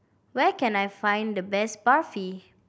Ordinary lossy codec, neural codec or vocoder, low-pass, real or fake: none; none; none; real